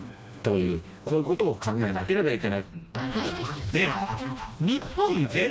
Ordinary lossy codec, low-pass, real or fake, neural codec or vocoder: none; none; fake; codec, 16 kHz, 1 kbps, FreqCodec, smaller model